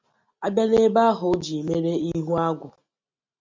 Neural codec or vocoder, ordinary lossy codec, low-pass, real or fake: none; MP3, 48 kbps; 7.2 kHz; real